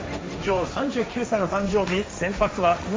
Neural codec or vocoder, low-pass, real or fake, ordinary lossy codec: codec, 16 kHz, 1.1 kbps, Voila-Tokenizer; none; fake; none